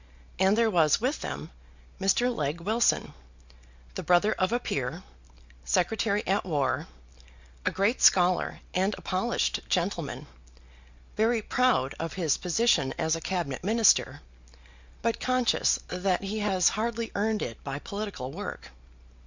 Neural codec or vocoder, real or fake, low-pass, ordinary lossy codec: none; real; 7.2 kHz; Opus, 64 kbps